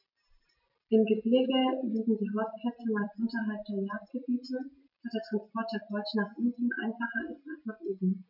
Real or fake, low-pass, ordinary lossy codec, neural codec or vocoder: real; 5.4 kHz; none; none